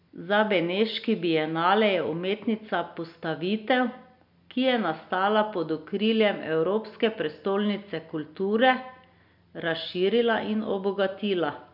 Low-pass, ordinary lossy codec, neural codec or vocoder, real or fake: 5.4 kHz; none; none; real